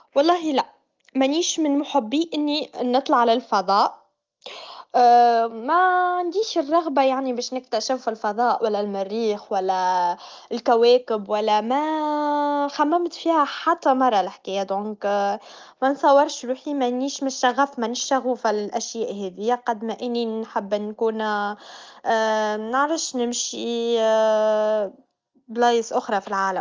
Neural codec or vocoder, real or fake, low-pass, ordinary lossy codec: none; real; 7.2 kHz; Opus, 32 kbps